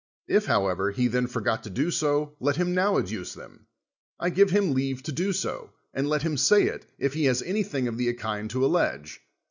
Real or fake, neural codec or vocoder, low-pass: real; none; 7.2 kHz